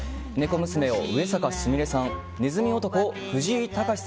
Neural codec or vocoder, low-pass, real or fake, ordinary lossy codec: none; none; real; none